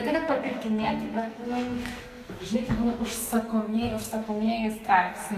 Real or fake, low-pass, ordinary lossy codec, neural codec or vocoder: fake; 14.4 kHz; MP3, 96 kbps; codec, 44.1 kHz, 2.6 kbps, SNAC